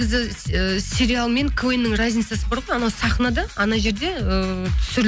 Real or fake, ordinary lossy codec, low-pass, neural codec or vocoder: real; none; none; none